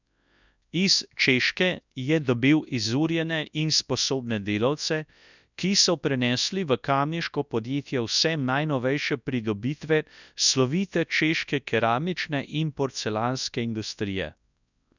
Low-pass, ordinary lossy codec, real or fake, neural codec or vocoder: 7.2 kHz; none; fake; codec, 24 kHz, 0.9 kbps, WavTokenizer, large speech release